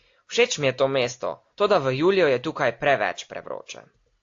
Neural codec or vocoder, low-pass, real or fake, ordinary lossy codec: none; 7.2 kHz; real; AAC, 48 kbps